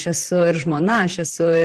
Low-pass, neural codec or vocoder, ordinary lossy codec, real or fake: 14.4 kHz; vocoder, 44.1 kHz, 128 mel bands, Pupu-Vocoder; Opus, 16 kbps; fake